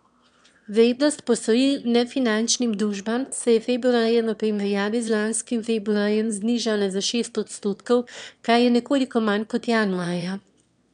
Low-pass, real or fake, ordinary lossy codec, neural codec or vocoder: 9.9 kHz; fake; none; autoencoder, 22.05 kHz, a latent of 192 numbers a frame, VITS, trained on one speaker